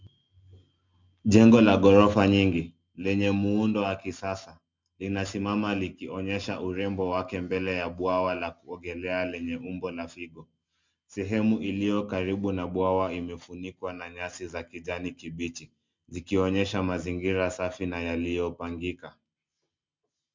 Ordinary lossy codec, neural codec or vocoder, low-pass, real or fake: MP3, 64 kbps; none; 7.2 kHz; real